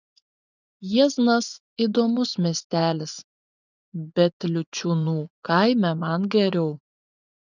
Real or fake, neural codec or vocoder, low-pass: fake; vocoder, 24 kHz, 100 mel bands, Vocos; 7.2 kHz